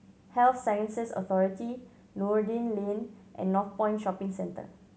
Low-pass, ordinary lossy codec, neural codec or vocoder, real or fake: none; none; none; real